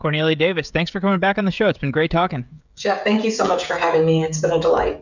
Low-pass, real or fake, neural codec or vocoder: 7.2 kHz; fake; codec, 16 kHz, 16 kbps, FreqCodec, smaller model